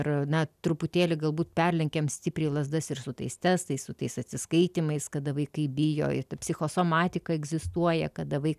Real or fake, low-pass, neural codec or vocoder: real; 14.4 kHz; none